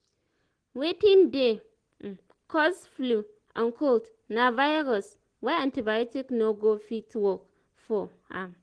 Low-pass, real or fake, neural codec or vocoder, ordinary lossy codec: 9.9 kHz; real; none; Opus, 16 kbps